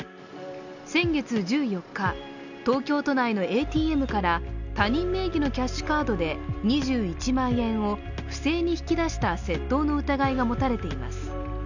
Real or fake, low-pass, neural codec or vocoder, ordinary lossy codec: real; 7.2 kHz; none; none